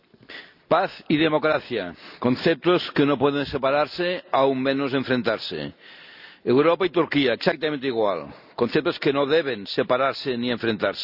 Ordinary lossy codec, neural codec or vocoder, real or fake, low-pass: none; none; real; 5.4 kHz